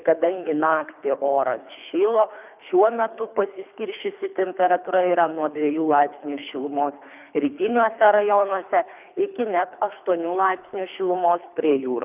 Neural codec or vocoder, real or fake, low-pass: codec, 24 kHz, 3 kbps, HILCodec; fake; 3.6 kHz